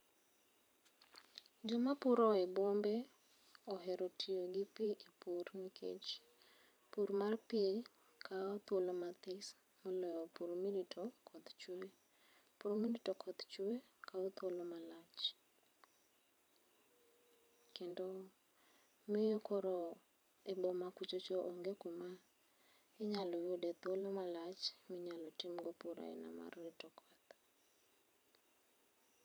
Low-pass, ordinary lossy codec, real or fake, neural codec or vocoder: none; none; fake; vocoder, 44.1 kHz, 128 mel bands every 512 samples, BigVGAN v2